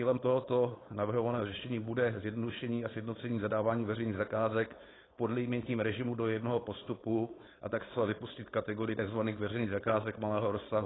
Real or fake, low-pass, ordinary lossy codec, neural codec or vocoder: fake; 7.2 kHz; AAC, 16 kbps; codec, 16 kHz, 4.8 kbps, FACodec